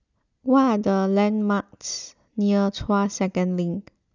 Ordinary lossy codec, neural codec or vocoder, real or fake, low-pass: none; none; real; 7.2 kHz